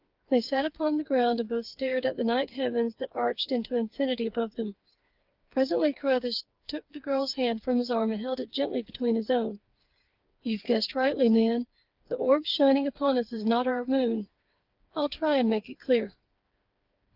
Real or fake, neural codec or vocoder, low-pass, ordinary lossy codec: fake; codec, 16 kHz, 4 kbps, FreqCodec, smaller model; 5.4 kHz; Opus, 32 kbps